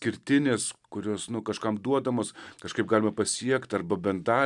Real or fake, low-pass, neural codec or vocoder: real; 10.8 kHz; none